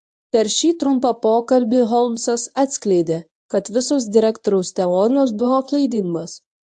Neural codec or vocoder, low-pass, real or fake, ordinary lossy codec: codec, 24 kHz, 0.9 kbps, WavTokenizer, medium speech release version 1; 10.8 kHz; fake; AAC, 64 kbps